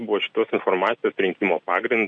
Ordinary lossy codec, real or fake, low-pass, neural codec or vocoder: MP3, 96 kbps; real; 9.9 kHz; none